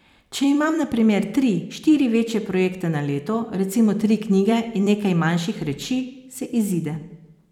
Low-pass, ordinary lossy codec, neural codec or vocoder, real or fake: 19.8 kHz; none; vocoder, 44.1 kHz, 128 mel bands every 256 samples, BigVGAN v2; fake